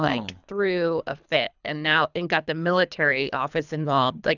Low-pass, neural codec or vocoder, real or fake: 7.2 kHz; codec, 24 kHz, 3 kbps, HILCodec; fake